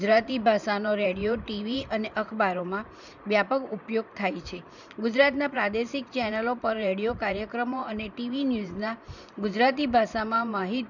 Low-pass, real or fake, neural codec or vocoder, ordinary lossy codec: 7.2 kHz; fake; vocoder, 44.1 kHz, 128 mel bands every 512 samples, BigVGAN v2; none